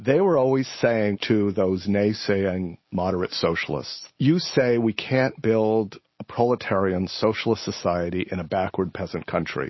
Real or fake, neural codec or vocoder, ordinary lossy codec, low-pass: real; none; MP3, 24 kbps; 7.2 kHz